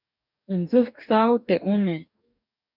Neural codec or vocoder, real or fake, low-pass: codec, 44.1 kHz, 2.6 kbps, DAC; fake; 5.4 kHz